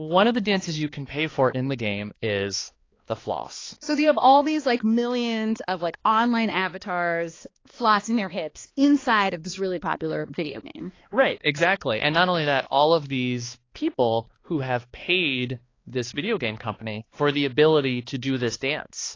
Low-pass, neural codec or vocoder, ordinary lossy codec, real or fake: 7.2 kHz; codec, 16 kHz, 2 kbps, X-Codec, HuBERT features, trained on balanced general audio; AAC, 32 kbps; fake